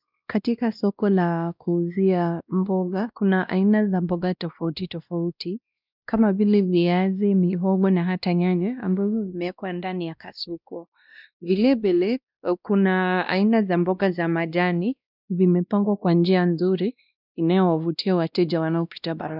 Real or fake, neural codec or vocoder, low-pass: fake; codec, 16 kHz, 1 kbps, X-Codec, WavLM features, trained on Multilingual LibriSpeech; 5.4 kHz